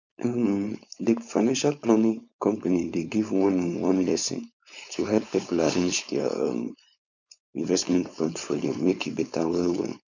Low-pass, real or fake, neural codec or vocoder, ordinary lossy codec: 7.2 kHz; fake; codec, 16 kHz, 4.8 kbps, FACodec; none